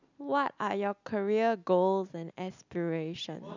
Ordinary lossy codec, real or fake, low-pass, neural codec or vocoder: none; real; 7.2 kHz; none